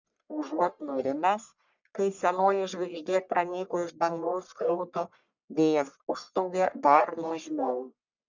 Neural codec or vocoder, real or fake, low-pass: codec, 44.1 kHz, 1.7 kbps, Pupu-Codec; fake; 7.2 kHz